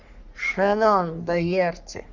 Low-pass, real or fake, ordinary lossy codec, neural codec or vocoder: 7.2 kHz; fake; none; codec, 44.1 kHz, 3.4 kbps, Pupu-Codec